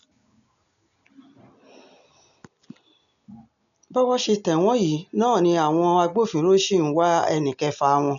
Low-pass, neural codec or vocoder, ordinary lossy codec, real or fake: 7.2 kHz; none; none; real